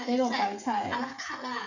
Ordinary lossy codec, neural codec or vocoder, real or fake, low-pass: none; codec, 16 kHz, 16 kbps, FreqCodec, smaller model; fake; 7.2 kHz